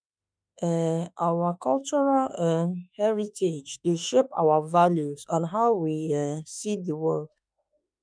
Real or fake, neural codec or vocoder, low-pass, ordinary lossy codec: fake; autoencoder, 48 kHz, 32 numbers a frame, DAC-VAE, trained on Japanese speech; 9.9 kHz; none